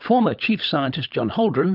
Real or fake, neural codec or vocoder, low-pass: fake; codec, 16 kHz, 16 kbps, FunCodec, trained on LibriTTS, 50 frames a second; 5.4 kHz